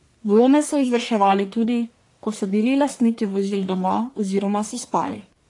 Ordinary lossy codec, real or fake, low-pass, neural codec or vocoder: AAC, 48 kbps; fake; 10.8 kHz; codec, 44.1 kHz, 1.7 kbps, Pupu-Codec